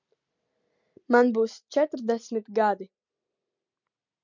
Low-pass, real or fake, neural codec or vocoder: 7.2 kHz; real; none